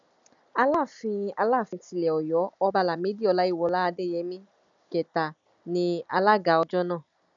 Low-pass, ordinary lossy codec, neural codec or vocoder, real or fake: 7.2 kHz; none; none; real